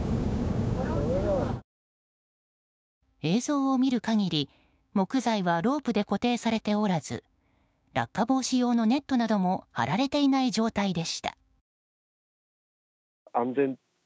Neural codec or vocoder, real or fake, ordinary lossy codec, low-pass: codec, 16 kHz, 6 kbps, DAC; fake; none; none